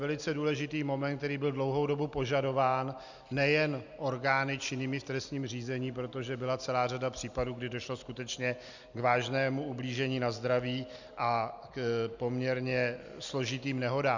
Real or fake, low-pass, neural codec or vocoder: real; 7.2 kHz; none